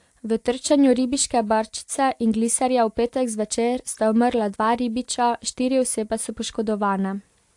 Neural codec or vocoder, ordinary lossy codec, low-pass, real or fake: none; AAC, 64 kbps; 10.8 kHz; real